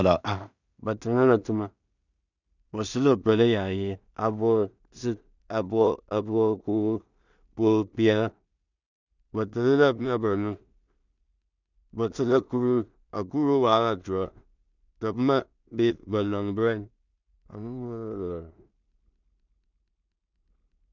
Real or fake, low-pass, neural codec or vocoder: fake; 7.2 kHz; codec, 16 kHz in and 24 kHz out, 0.4 kbps, LongCat-Audio-Codec, two codebook decoder